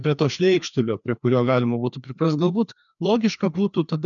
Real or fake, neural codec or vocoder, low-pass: fake; codec, 16 kHz, 2 kbps, FreqCodec, larger model; 7.2 kHz